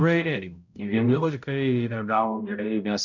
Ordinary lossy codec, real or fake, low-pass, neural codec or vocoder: MP3, 64 kbps; fake; 7.2 kHz; codec, 16 kHz, 0.5 kbps, X-Codec, HuBERT features, trained on balanced general audio